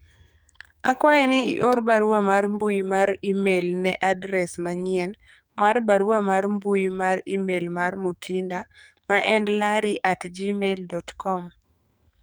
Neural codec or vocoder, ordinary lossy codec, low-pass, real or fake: codec, 44.1 kHz, 2.6 kbps, SNAC; none; none; fake